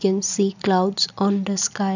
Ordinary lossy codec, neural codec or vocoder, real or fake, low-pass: none; none; real; 7.2 kHz